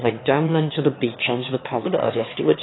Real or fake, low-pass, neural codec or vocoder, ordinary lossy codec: fake; 7.2 kHz; autoencoder, 22.05 kHz, a latent of 192 numbers a frame, VITS, trained on one speaker; AAC, 16 kbps